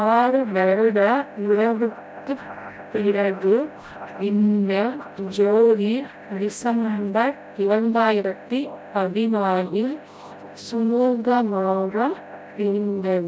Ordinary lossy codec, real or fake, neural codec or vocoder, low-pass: none; fake; codec, 16 kHz, 0.5 kbps, FreqCodec, smaller model; none